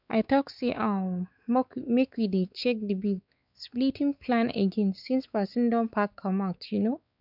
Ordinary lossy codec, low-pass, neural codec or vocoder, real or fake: none; 5.4 kHz; codec, 16 kHz, 4 kbps, X-Codec, WavLM features, trained on Multilingual LibriSpeech; fake